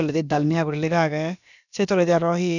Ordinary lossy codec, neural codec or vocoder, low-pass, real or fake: none; codec, 16 kHz, about 1 kbps, DyCAST, with the encoder's durations; 7.2 kHz; fake